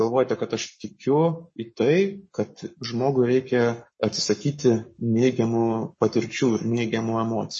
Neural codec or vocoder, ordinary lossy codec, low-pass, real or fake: codec, 44.1 kHz, 7.8 kbps, Pupu-Codec; MP3, 32 kbps; 10.8 kHz; fake